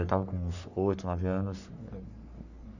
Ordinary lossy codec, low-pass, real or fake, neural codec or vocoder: none; 7.2 kHz; fake; codec, 44.1 kHz, 3.4 kbps, Pupu-Codec